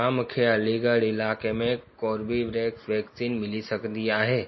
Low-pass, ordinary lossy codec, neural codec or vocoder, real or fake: 7.2 kHz; MP3, 24 kbps; none; real